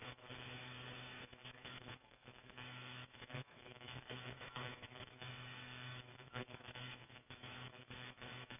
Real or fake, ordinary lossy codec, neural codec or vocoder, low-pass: fake; none; autoencoder, 48 kHz, 128 numbers a frame, DAC-VAE, trained on Japanese speech; 3.6 kHz